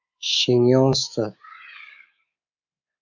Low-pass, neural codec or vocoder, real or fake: 7.2 kHz; codec, 24 kHz, 3.1 kbps, DualCodec; fake